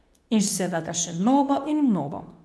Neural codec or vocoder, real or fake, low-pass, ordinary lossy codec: codec, 24 kHz, 0.9 kbps, WavTokenizer, medium speech release version 2; fake; none; none